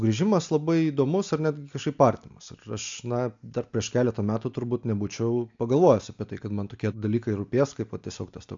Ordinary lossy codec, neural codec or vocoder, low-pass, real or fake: AAC, 64 kbps; none; 7.2 kHz; real